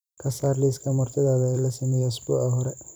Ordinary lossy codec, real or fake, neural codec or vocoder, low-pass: none; fake; vocoder, 44.1 kHz, 128 mel bands every 256 samples, BigVGAN v2; none